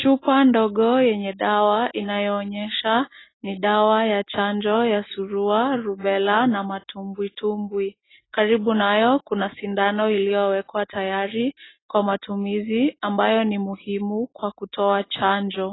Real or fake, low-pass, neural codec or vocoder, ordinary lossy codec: real; 7.2 kHz; none; AAC, 16 kbps